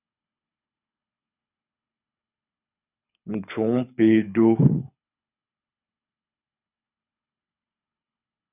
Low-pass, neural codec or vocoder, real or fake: 3.6 kHz; codec, 24 kHz, 6 kbps, HILCodec; fake